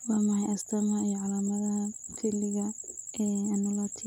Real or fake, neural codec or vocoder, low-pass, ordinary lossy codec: real; none; 19.8 kHz; none